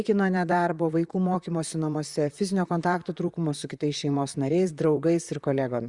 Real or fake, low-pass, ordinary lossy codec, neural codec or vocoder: fake; 10.8 kHz; Opus, 64 kbps; vocoder, 44.1 kHz, 128 mel bands, Pupu-Vocoder